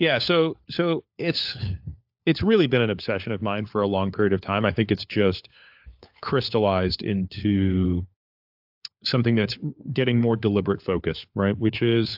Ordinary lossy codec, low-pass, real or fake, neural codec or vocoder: AAC, 48 kbps; 5.4 kHz; fake; codec, 16 kHz, 4 kbps, FunCodec, trained on LibriTTS, 50 frames a second